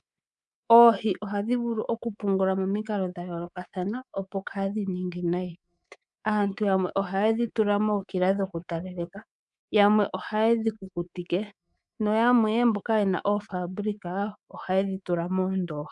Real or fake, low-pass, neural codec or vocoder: fake; 10.8 kHz; codec, 24 kHz, 3.1 kbps, DualCodec